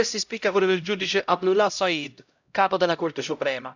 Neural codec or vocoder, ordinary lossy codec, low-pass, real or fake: codec, 16 kHz, 0.5 kbps, X-Codec, HuBERT features, trained on LibriSpeech; none; 7.2 kHz; fake